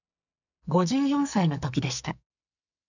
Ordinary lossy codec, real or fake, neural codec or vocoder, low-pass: none; fake; codec, 44.1 kHz, 2.6 kbps, SNAC; 7.2 kHz